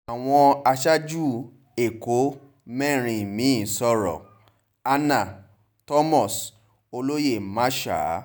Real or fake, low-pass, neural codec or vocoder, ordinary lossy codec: real; none; none; none